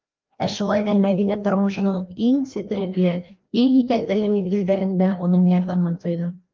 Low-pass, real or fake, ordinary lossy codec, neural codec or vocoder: 7.2 kHz; fake; Opus, 24 kbps; codec, 16 kHz, 1 kbps, FreqCodec, larger model